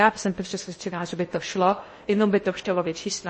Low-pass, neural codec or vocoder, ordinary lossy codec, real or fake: 9.9 kHz; codec, 16 kHz in and 24 kHz out, 0.8 kbps, FocalCodec, streaming, 65536 codes; MP3, 32 kbps; fake